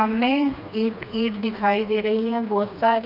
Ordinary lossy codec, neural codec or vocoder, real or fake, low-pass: none; codec, 16 kHz, 2 kbps, FreqCodec, smaller model; fake; 5.4 kHz